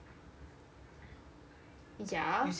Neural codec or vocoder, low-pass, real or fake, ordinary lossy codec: none; none; real; none